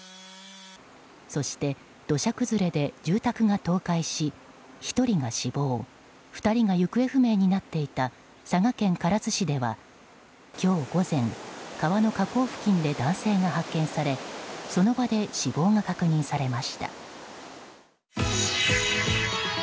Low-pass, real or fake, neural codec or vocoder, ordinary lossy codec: none; real; none; none